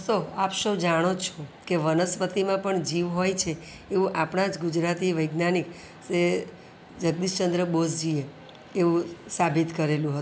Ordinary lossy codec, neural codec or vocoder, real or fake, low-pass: none; none; real; none